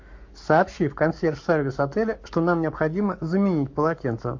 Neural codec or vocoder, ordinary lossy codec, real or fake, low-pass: codec, 44.1 kHz, 7.8 kbps, Pupu-Codec; MP3, 48 kbps; fake; 7.2 kHz